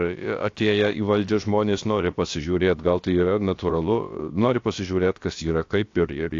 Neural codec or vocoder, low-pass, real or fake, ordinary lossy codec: codec, 16 kHz, about 1 kbps, DyCAST, with the encoder's durations; 7.2 kHz; fake; AAC, 48 kbps